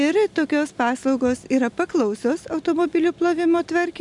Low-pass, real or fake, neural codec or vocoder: 10.8 kHz; real; none